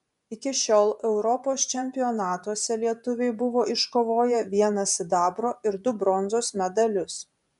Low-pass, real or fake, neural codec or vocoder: 10.8 kHz; fake; vocoder, 24 kHz, 100 mel bands, Vocos